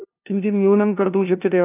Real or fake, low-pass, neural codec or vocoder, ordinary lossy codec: fake; 3.6 kHz; codec, 16 kHz, 0.5 kbps, FunCodec, trained on LibriTTS, 25 frames a second; none